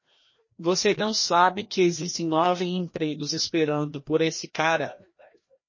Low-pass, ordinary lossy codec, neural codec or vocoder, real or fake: 7.2 kHz; MP3, 32 kbps; codec, 16 kHz, 1 kbps, FreqCodec, larger model; fake